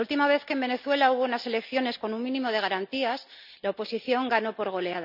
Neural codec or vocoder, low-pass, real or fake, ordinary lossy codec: none; 5.4 kHz; real; none